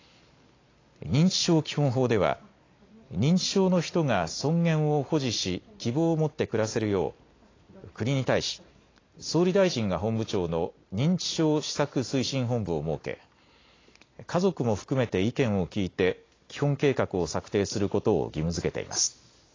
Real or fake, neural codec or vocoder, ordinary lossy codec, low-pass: real; none; AAC, 32 kbps; 7.2 kHz